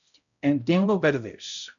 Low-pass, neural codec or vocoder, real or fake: 7.2 kHz; codec, 16 kHz, 0.5 kbps, X-Codec, HuBERT features, trained on balanced general audio; fake